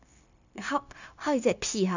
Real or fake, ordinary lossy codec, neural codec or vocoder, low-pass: fake; none; codec, 16 kHz, 0.9 kbps, LongCat-Audio-Codec; 7.2 kHz